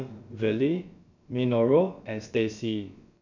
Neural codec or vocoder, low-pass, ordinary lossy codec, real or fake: codec, 16 kHz, about 1 kbps, DyCAST, with the encoder's durations; 7.2 kHz; none; fake